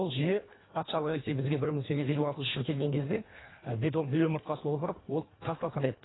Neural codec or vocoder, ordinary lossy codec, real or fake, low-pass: codec, 24 kHz, 1.5 kbps, HILCodec; AAC, 16 kbps; fake; 7.2 kHz